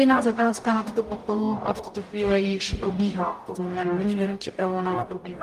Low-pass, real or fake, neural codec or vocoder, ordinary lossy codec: 14.4 kHz; fake; codec, 44.1 kHz, 0.9 kbps, DAC; Opus, 32 kbps